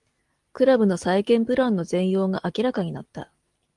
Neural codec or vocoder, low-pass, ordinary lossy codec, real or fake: none; 10.8 kHz; Opus, 24 kbps; real